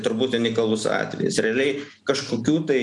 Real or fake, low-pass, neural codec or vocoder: real; 10.8 kHz; none